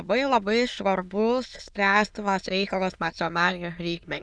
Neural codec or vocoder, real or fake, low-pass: autoencoder, 22.05 kHz, a latent of 192 numbers a frame, VITS, trained on many speakers; fake; 9.9 kHz